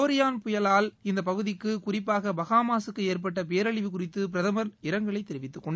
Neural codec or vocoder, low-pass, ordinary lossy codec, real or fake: none; none; none; real